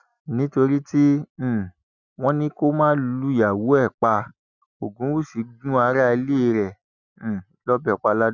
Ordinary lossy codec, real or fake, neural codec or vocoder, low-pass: none; real; none; 7.2 kHz